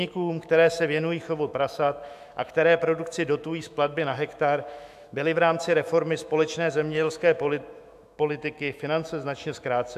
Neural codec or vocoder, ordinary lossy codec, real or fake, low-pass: autoencoder, 48 kHz, 128 numbers a frame, DAC-VAE, trained on Japanese speech; AAC, 96 kbps; fake; 14.4 kHz